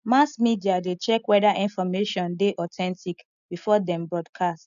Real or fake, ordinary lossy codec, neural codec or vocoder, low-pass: real; none; none; 7.2 kHz